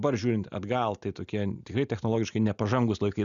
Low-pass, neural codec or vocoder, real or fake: 7.2 kHz; none; real